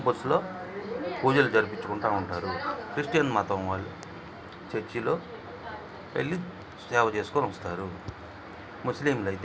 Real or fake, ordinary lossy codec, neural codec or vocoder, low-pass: real; none; none; none